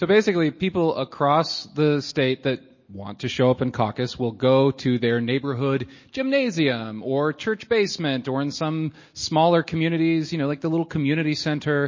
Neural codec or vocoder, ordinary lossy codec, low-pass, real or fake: none; MP3, 32 kbps; 7.2 kHz; real